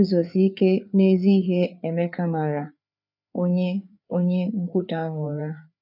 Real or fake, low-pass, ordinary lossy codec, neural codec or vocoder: fake; 5.4 kHz; none; codec, 16 kHz, 4 kbps, FreqCodec, larger model